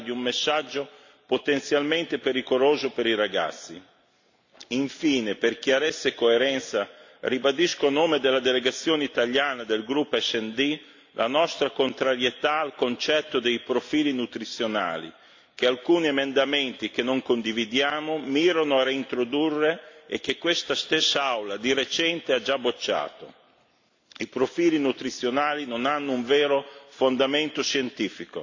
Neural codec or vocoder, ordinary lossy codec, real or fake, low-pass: none; AAC, 48 kbps; real; 7.2 kHz